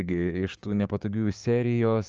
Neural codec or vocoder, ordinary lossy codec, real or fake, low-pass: codec, 16 kHz, 6 kbps, DAC; Opus, 24 kbps; fake; 7.2 kHz